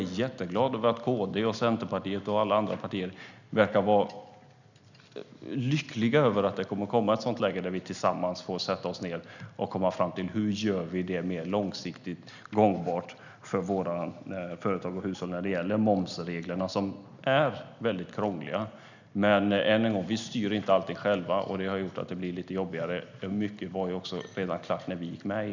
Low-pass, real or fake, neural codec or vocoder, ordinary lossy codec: 7.2 kHz; real; none; none